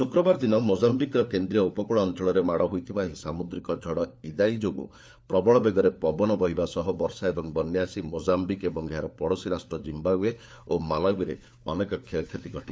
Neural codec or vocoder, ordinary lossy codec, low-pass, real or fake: codec, 16 kHz, 4 kbps, FunCodec, trained on LibriTTS, 50 frames a second; none; none; fake